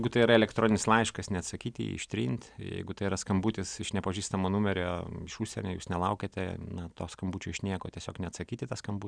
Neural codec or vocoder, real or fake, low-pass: none; real; 9.9 kHz